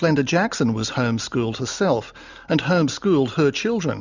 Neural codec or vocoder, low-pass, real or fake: none; 7.2 kHz; real